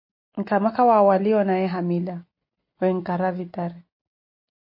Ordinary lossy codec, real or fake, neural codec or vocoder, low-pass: MP3, 32 kbps; real; none; 5.4 kHz